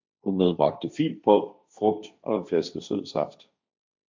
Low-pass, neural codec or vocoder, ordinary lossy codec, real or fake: 7.2 kHz; codec, 16 kHz, 1.1 kbps, Voila-Tokenizer; MP3, 64 kbps; fake